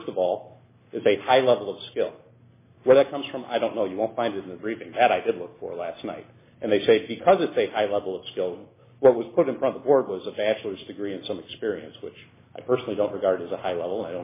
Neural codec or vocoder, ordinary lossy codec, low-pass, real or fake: none; MP3, 16 kbps; 3.6 kHz; real